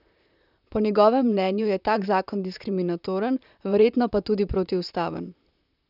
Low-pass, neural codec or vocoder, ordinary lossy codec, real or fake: 5.4 kHz; vocoder, 44.1 kHz, 128 mel bands, Pupu-Vocoder; none; fake